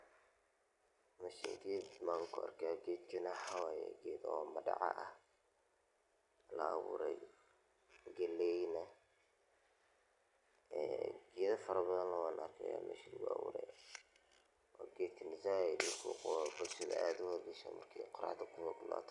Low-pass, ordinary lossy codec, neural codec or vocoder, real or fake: 10.8 kHz; none; none; real